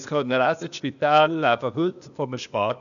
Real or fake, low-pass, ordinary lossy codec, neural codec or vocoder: fake; 7.2 kHz; none; codec, 16 kHz, 0.8 kbps, ZipCodec